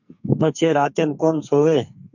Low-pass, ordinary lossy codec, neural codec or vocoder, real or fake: 7.2 kHz; MP3, 64 kbps; codec, 44.1 kHz, 2.6 kbps, SNAC; fake